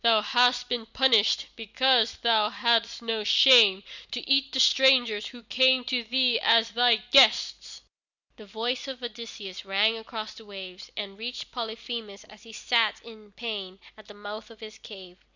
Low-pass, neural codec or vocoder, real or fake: 7.2 kHz; none; real